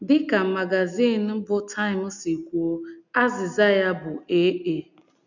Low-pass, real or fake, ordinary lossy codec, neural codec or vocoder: 7.2 kHz; real; none; none